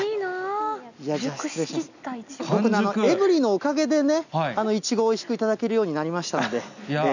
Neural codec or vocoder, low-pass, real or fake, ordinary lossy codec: none; 7.2 kHz; real; none